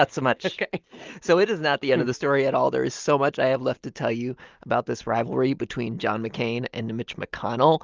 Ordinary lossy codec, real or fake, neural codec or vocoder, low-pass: Opus, 32 kbps; real; none; 7.2 kHz